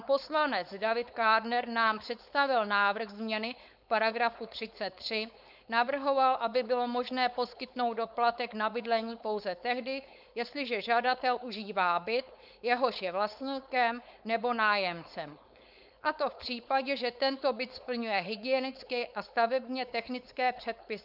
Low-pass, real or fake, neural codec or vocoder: 5.4 kHz; fake; codec, 16 kHz, 4.8 kbps, FACodec